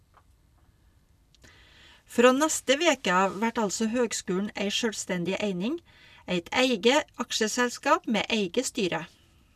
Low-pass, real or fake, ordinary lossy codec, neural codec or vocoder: 14.4 kHz; real; none; none